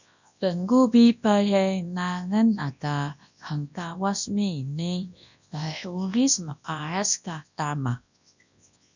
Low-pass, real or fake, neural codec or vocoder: 7.2 kHz; fake; codec, 24 kHz, 0.9 kbps, WavTokenizer, large speech release